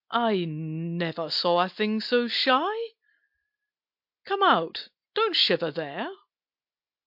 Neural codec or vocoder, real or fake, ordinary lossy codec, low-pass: none; real; MP3, 48 kbps; 5.4 kHz